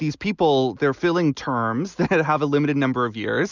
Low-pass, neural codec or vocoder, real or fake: 7.2 kHz; none; real